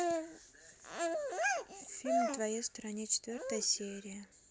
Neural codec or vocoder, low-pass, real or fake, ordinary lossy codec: none; none; real; none